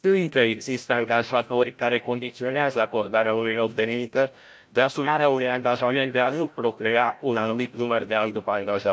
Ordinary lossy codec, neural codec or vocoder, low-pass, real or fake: none; codec, 16 kHz, 0.5 kbps, FreqCodec, larger model; none; fake